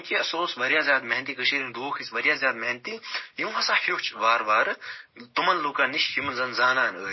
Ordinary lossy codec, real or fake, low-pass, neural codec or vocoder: MP3, 24 kbps; real; 7.2 kHz; none